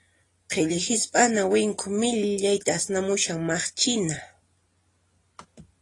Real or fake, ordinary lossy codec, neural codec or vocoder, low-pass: real; AAC, 32 kbps; none; 10.8 kHz